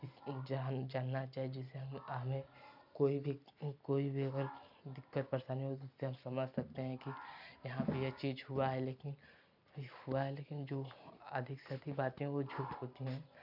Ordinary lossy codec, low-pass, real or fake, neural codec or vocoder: none; 5.4 kHz; real; none